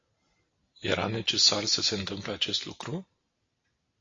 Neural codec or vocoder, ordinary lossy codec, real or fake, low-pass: none; AAC, 32 kbps; real; 7.2 kHz